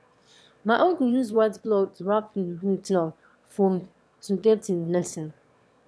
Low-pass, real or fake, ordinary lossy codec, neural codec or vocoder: none; fake; none; autoencoder, 22.05 kHz, a latent of 192 numbers a frame, VITS, trained on one speaker